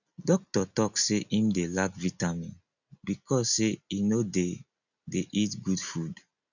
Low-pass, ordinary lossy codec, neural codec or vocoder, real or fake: 7.2 kHz; none; none; real